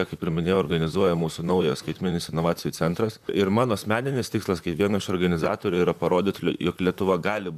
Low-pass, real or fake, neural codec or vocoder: 14.4 kHz; fake; vocoder, 44.1 kHz, 128 mel bands, Pupu-Vocoder